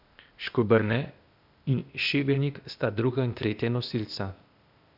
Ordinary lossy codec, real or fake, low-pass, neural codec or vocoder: none; fake; 5.4 kHz; codec, 16 kHz, 0.8 kbps, ZipCodec